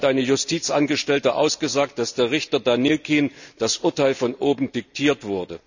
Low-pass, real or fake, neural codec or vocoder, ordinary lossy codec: 7.2 kHz; real; none; none